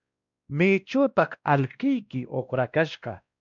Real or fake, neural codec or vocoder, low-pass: fake; codec, 16 kHz, 1 kbps, X-Codec, WavLM features, trained on Multilingual LibriSpeech; 7.2 kHz